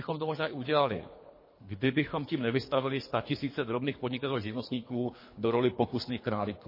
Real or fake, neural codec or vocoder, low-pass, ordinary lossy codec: fake; codec, 24 kHz, 3 kbps, HILCodec; 5.4 kHz; MP3, 24 kbps